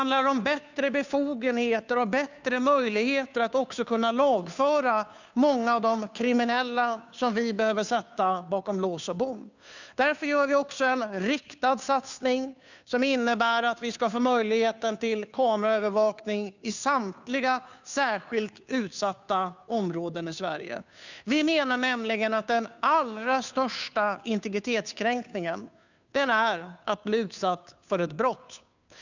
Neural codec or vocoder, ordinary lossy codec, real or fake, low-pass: codec, 16 kHz, 2 kbps, FunCodec, trained on Chinese and English, 25 frames a second; none; fake; 7.2 kHz